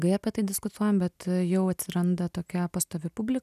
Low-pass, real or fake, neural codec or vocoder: 14.4 kHz; real; none